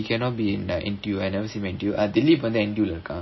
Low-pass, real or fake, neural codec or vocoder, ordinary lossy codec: 7.2 kHz; real; none; MP3, 24 kbps